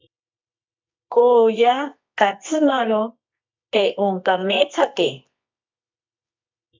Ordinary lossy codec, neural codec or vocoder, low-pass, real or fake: MP3, 64 kbps; codec, 24 kHz, 0.9 kbps, WavTokenizer, medium music audio release; 7.2 kHz; fake